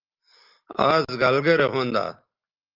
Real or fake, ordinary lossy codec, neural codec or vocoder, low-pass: real; Opus, 32 kbps; none; 5.4 kHz